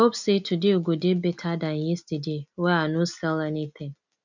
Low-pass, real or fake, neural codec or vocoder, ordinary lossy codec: 7.2 kHz; real; none; none